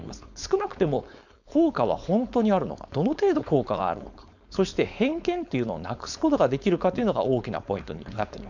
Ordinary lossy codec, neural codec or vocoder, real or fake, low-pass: none; codec, 16 kHz, 4.8 kbps, FACodec; fake; 7.2 kHz